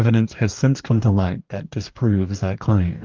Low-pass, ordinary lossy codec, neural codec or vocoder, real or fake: 7.2 kHz; Opus, 32 kbps; codec, 44.1 kHz, 2.6 kbps, DAC; fake